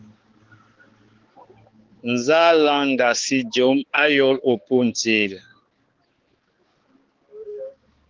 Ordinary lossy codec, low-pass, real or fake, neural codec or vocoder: Opus, 16 kbps; 7.2 kHz; fake; codec, 16 kHz, 4 kbps, X-Codec, HuBERT features, trained on balanced general audio